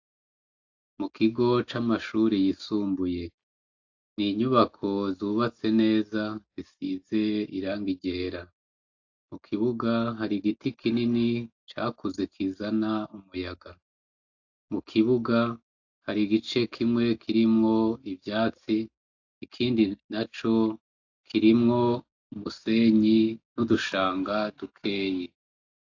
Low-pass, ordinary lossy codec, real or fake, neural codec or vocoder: 7.2 kHz; AAC, 48 kbps; real; none